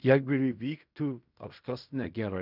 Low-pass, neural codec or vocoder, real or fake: 5.4 kHz; codec, 16 kHz in and 24 kHz out, 0.4 kbps, LongCat-Audio-Codec, fine tuned four codebook decoder; fake